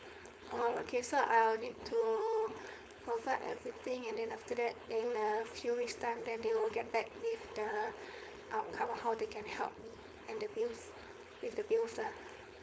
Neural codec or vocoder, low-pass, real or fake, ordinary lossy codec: codec, 16 kHz, 4.8 kbps, FACodec; none; fake; none